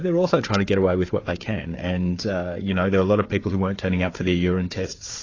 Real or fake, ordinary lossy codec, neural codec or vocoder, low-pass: fake; AAC, 32 kbps; codec, 16 kHz, 4 kbps, FunCodec, trained on Chinese and English, 50 frames a second; 7.2 kHz